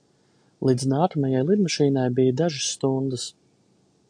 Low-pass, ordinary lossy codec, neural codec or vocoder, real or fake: 9.9 kHz; AAC, 64 kbps; none; real